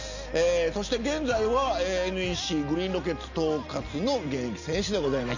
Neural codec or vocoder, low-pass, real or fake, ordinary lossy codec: none; 7.2 kHz; real; none